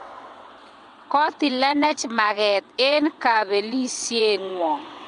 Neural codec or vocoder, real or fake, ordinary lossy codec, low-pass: vocoder, 22.05 kHz, 80 mel bands, WaveNeXt; fake; MP3, 64 kbps; 9.9 kHz